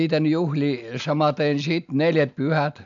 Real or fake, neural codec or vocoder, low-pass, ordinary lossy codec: real; none; 7.2 kHz; none